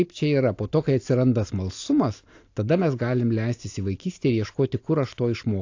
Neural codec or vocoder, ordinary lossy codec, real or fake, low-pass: none; AAC, 48 kbps; real; 7.2 kHz